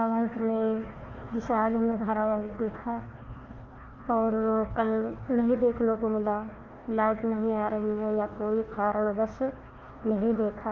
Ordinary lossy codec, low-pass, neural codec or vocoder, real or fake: Opus, 32 kbps; 7.2 kHz; codec, 16 kHz, 1 kbps, FunCodec, trained on Chinese and English, 50 frames a second; fake